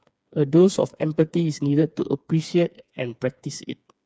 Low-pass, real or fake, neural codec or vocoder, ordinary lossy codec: none; fake; codec, 16 kHz, 4 kbps, FreqCodec, smaller model; none